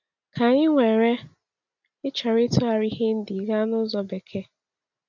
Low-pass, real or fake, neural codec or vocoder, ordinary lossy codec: 7.2 kHz; real; none; none